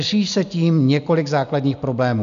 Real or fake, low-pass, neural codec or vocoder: real; 7.2 kHz; none